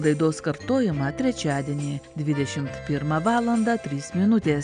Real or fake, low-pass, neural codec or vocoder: real; 9.9 kHz; none